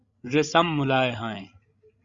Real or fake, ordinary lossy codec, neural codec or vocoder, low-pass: fake; Opus, 64 kbps; codec, 16 kHz, 16 kbps, FreqCodec, larger model; 7.2 kHz